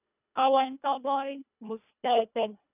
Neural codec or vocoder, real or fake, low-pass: codec, 24 kHz, 1.5 kbps, HILCodec; fake; 3.6 kHz